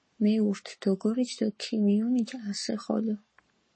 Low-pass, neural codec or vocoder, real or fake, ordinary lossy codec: 9.9 kHz; codec, 44.1 kHz, 7.8 kbps, Pupu-Codec; fake; MP3, 32 kbps